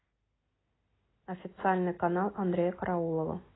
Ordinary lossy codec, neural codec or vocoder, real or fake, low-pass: AAC, 16 kbps; none; real; 7.2 kHz